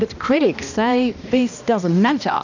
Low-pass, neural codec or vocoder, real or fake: 7.2 kHz; codec, 16 kHz, 1 kbps, X-Codec, HuBERT features, trained on balanced general audio; fake